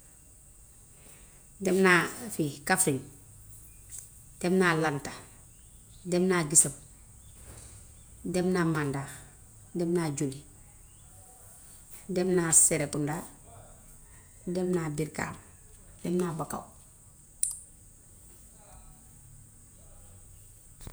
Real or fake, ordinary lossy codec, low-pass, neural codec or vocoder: fake; none; none; vocoder, 48 kHz, 128 mel bands, Vocos